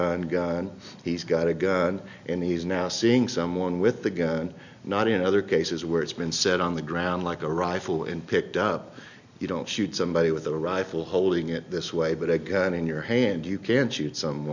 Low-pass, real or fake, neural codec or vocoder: 7.2 kHz; real; none